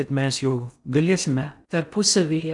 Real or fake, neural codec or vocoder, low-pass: fake; codec, 16 kHz in and 24 kHz out, 0.6 kbps, FocalCodec, streaming, 4096 codes; 10.8 kHz